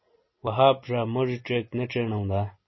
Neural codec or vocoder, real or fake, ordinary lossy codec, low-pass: none; real; MP3, 24 kbps; 7.2 kHz